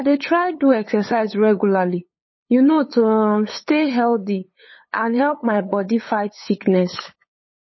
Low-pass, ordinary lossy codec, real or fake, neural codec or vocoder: 7.2 kHz; MP3, 24 kbps; fake; codec, 16 kHz, 8 kbps, FunCodec, trained on LibriTTS, 25 frames a second